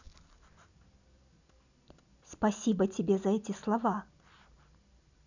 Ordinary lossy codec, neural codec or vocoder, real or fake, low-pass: none; none; real; 7.2 kHz